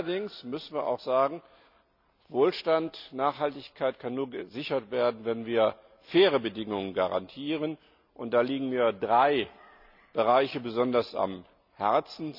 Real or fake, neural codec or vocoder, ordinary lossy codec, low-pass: real; none; none; 5.4 kHz